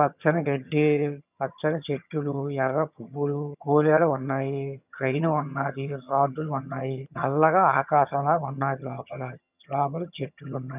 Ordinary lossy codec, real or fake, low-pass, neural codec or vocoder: none; fake; 3.6 kHz; vocoder, 22.05 kHz, 80 mel bands, HiFi-GAN